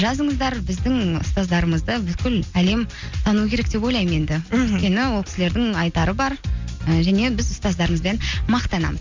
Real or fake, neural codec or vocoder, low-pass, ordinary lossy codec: real; none; 7.2 kHz; MP3, 64 kbps